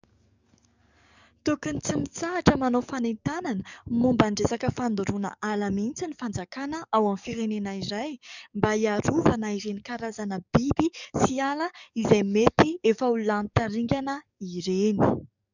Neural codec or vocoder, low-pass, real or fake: codec, 44.1 kHz, 7.8 kbps, DAC; 7.2 kHz; fake